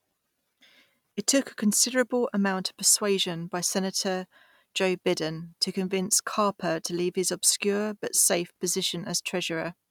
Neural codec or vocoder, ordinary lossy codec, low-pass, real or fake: none; none; 19.8 kHz; real